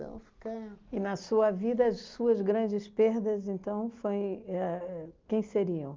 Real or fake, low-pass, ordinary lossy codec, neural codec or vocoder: real; 7.2 kHz; Opus, 24 kbps; none